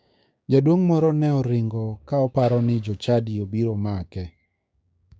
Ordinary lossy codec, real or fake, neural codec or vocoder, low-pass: none; fake; codec, 16 kHz, 6 kbps, DAC; none